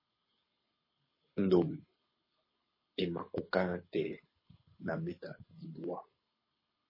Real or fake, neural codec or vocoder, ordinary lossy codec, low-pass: fake; codec, 24 kHz, 6 kbps, HILCodec; MP3, 24 kbps; 5.4 kHz